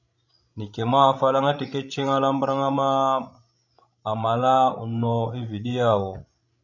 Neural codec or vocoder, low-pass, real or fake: codec, 16 kHz, 16 kbps, FreqCodec, larger model; 7.2 kHz; fake